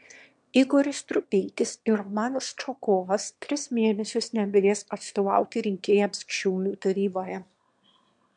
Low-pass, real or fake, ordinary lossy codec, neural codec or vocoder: 9.9 kHz; fake; MP3, 64 kbps; autoencoder, 22.05 kHz, a latent of 192 numbers a frame, VITS, trained on one speaker